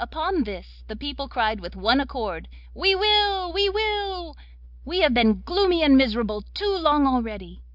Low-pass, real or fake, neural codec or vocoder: 5.4 kHz; real; none